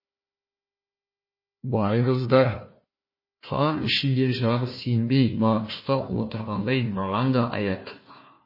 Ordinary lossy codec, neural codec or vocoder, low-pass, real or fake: MP3, 24 kbps; codec, 16 kHz, 1 kbps, FunCodec, trained on Chinese and English, 50 frames a second; 5.4 kHz; fake